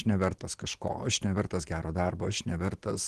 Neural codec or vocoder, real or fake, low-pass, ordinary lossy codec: none; real; 10.8 kHz; Opus, 16 kbps